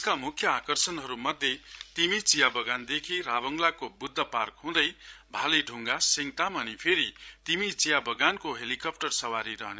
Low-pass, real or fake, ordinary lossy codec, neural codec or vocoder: none; fake; none; codec, 16 kHz, 16 kbps, FreqCodec, larger model